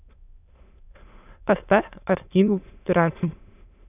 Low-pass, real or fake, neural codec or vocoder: 3.6 kHz; fake; autoencoder, 22.05 kHz, a latent of 192 numbers a frame, VITS, trained on many speakers